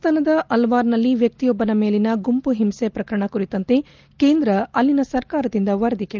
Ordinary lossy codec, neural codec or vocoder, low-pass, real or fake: Opus, 32 kbps; none; 7.2 kHz; real